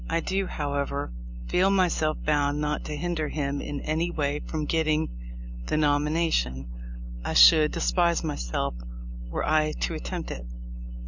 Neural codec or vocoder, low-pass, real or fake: none; 7.2 kHz; real